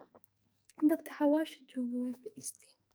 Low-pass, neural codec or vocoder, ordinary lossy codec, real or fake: none; codec, 44.1 kHz, 2.6 kbps, SNAC; none; fake